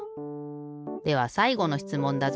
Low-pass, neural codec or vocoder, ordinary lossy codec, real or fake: none; none; none; real